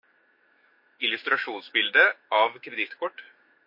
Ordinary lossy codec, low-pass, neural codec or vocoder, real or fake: MP3, 32 kbps; 5.4 kHz; autoencoder, 48 kHz, 128 numbers a frame, DAC-VAE, trained on Japanese speech; fake